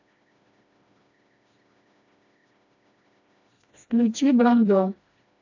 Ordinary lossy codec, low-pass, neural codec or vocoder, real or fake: AAC, 48 kbps; 7.2 kHz; codec, 16 kHz, 1 kbps, FreqCodec, smaller model; fake